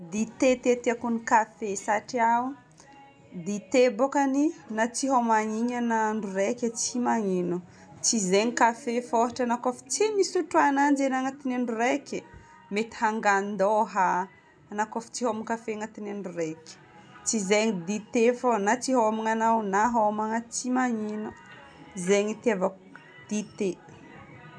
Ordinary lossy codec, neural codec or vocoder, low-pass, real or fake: none; none; none; real